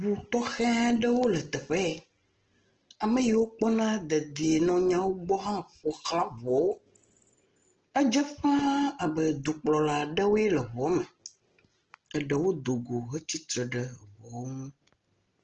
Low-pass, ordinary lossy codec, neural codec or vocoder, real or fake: 7.2 kHz; Opus, 16 kbps; none; real